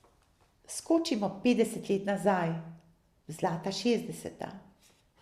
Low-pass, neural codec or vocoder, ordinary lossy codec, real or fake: 14.4 kHz; none; Opus, 64 kbps; real